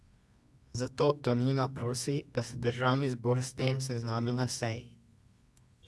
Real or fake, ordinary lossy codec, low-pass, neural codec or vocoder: fake; none; none; codec, 24 kHz, 0.9 kbps, WavTokenizer, medium music audio release